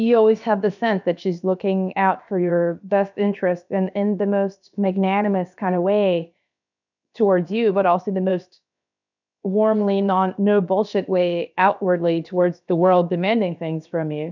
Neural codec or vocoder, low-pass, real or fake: codec, 16 kHz, about 1 kbps, DyCAST, with the encoder's durations; 7.2 kHz; fake